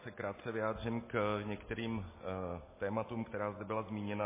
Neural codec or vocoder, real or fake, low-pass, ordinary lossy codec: none; real; 3.6 kHz; MP3, 16 kbps